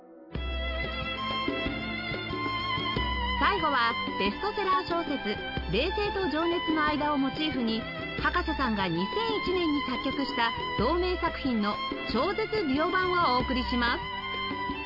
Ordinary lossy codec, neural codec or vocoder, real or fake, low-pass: none; none; real; 5.4 kHz